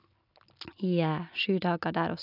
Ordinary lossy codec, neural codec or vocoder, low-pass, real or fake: none; none; 5.4 kHz; real